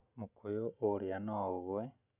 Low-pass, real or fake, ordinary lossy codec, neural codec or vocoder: 3.6 kHz; real; none; none